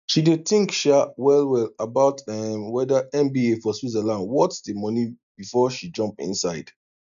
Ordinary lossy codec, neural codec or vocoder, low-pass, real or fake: none; none; 7.2 kHz; real